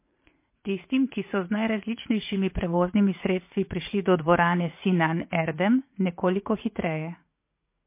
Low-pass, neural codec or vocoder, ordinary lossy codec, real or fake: 3.6 kHz; vocoder, 44.1 kHz, 80 mel bands, Vocos; MP3, 24 kbps; fake